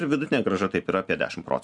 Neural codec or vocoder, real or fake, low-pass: none; real; 10.8 kHz